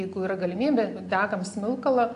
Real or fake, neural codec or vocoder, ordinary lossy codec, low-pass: real; none; AAC, 48 kbps; 10.8 kHz